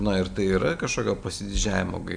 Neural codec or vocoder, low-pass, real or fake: none; 9.9 kHz; real